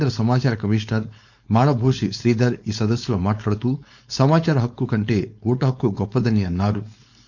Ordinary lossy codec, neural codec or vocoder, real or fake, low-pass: none; codec, 16 kHz, 4.8 kbps, FACodec; fake; 7.2 kHz